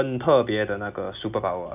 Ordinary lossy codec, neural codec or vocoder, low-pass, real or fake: none; none; 3.6 kHz; real